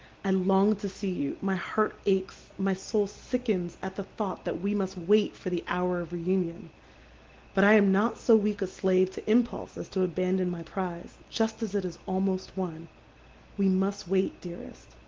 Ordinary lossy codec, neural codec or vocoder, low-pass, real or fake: Opus, 16 kbps; none; 7.2 kHz; real